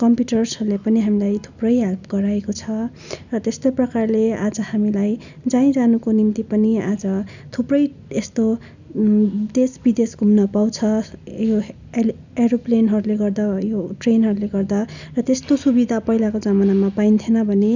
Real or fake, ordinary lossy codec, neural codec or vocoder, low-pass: real; none; none; 7.2 kHz